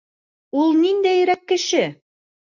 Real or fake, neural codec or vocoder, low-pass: real; none; 7.2 kHz